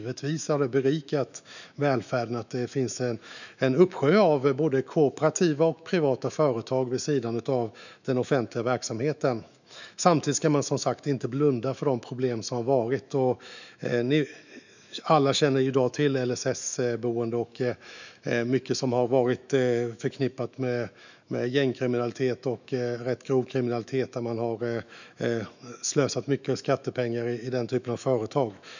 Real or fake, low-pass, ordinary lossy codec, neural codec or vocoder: fake; 7.2 kHz; none; autoencoder, 48 kHz, 128 numbers a frame, DAC-VAE, trained on Japanese speech